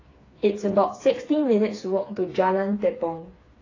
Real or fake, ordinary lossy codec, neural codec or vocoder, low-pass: fake; AAC, 32 kbps; codec, 16 kHz, 4 kbps, FreqCodec, smaller model; 7.2 kHz